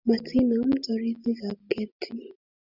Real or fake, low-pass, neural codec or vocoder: real; 5.4 kHz; none